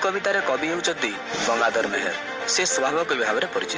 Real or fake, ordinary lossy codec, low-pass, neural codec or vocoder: real; Opus, 24 kbps; 7.2 kHz; none